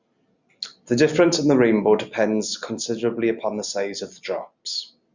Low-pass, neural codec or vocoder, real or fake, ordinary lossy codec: 7.2 kHz; none; real; Opus, 64 kbps